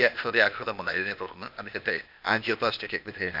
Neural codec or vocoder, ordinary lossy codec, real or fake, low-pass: codec, 16 kHz, 0.8 kbps, ZipCodec; none; fake; 5.4 kHz